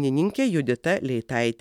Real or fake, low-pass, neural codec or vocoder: real; 19.8 kHz; none